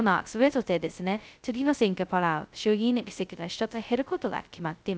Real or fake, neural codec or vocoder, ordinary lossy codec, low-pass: fake; codec, 16 kHz, 0.2 kbps, FocalCodec; none; none